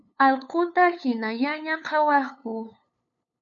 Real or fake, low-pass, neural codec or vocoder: fake; 7.2 kHz; codec, 16 kHz, 8 kbps, FunCodec, trained on LibriTTS, 25 frames a second